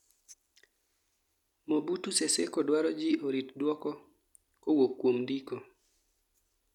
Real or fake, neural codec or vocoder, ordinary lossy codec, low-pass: real; none; none; 19.8 kHz